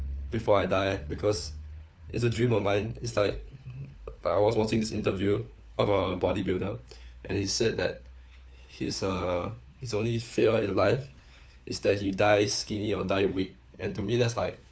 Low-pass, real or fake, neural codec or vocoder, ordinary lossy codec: none; fake; codec, 16 kHz, 4 kbps, FunCodec, trained on LibriTTS, 50 frames a second; none